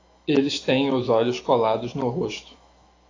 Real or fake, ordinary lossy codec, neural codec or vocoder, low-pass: fake; AAC, 32 kbps; autoencoder, 48 kHz, 128 numbers a frame, DAC-VAE, trained on Japanese speech; 7.2 kHz